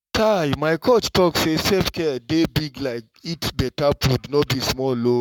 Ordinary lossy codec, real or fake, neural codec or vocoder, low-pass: MP3, 96 kbps; fake; codec, 44.1 kHz, 7.8 kbps, Pupu-Codec; 19.8 kHz